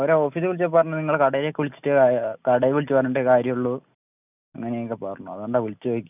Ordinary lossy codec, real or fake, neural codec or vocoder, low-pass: none; real; none; 3.6 kHz